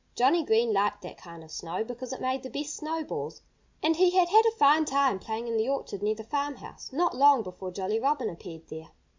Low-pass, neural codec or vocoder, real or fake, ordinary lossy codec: 7.2 kHz; none; real; MP3, 64 kbps